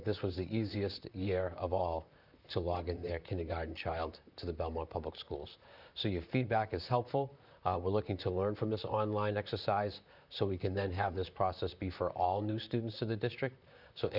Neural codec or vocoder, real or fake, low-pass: vocoder, 44.1 kHz, 128 mel bands, Pupu-Vocoder; fake; 5.4 kHz